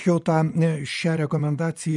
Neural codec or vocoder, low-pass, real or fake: vocoder, 24 kHz, 100 mel bands, Vocos; 10.8 kHz; fake